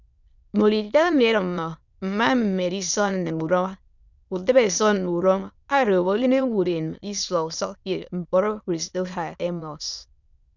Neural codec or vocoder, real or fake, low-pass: autoencoder, 22.05 kHz, a latent of 192 numbers a frame, VITS, trained on many speakers; fake; 7.2 kHz